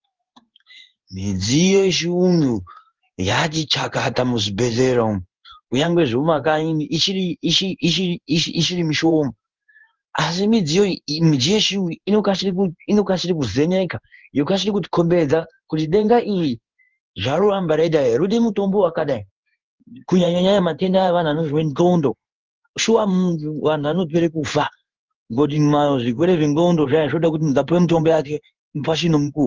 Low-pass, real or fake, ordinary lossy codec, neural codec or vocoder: 7.2 kHz; fake; Opus, 24 kbps; codec, 16 kHz in and 24 kHz out, 1 kbps, XY-Tokenizer